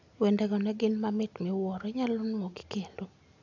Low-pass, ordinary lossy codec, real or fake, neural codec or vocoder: 7.2 kHz; AAC, 48 kbps; real; none